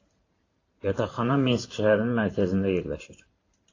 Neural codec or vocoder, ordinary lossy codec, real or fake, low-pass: vocoder, 44.1 kHz, 128 mel bands every 512 samples, BigVGAN v2; AAC, 32 kbps; fake; 7.2 kHz